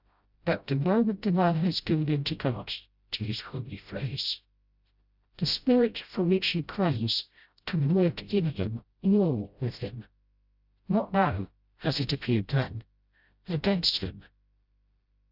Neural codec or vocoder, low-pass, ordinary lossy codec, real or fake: codec, 16 kHz, 0.5 kbps, FreqCodec, smaller model; 5.4 kHz; Opus, 64 kbps; fake